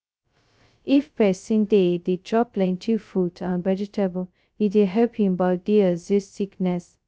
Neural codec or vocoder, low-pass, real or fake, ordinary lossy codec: codec, 16 kHz, 0.2 kbps, FocalCodec; none; fake; none